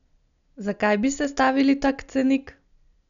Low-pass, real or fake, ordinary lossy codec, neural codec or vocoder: 7.2 kHz; real; none; none